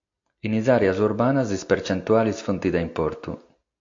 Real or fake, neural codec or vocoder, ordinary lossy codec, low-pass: real; none; AAC, 48 kbps; 7.2 kHz